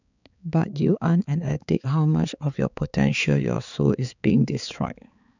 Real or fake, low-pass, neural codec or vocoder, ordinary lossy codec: fake; 7.2 kHz; codec, 16 kHz, 4 kbps, X-Codec, HuBERT features, trained on balanced general audio; none